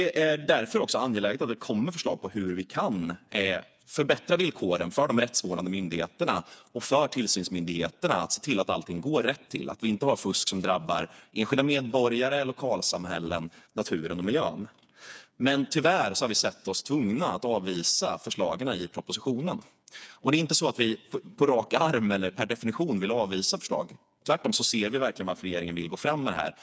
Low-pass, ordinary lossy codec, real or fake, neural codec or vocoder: none; none; fake; codec, 16 kHz, 4 kbps, FreqCodec, smaller model